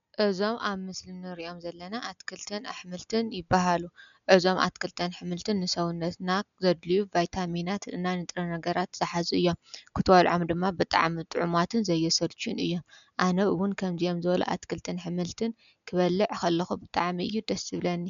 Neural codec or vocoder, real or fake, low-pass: none; real; 7.2 kHz